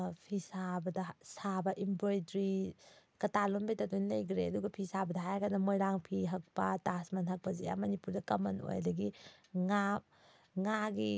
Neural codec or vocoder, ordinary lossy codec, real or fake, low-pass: none; none; real; none